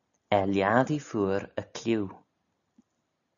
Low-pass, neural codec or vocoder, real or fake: 7.2 kHz; none; real